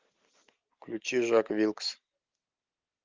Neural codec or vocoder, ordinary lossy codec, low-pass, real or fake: none; Opus, 24 kbps; 7.2 kHz; real